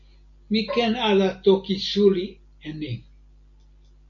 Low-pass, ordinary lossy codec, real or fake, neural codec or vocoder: 7.2 kHz; AAC, 64 kbps; real; none